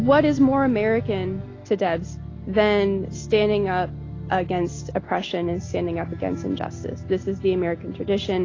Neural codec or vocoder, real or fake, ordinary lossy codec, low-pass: none; real; AAC, 32 kbps; 7.2 kHz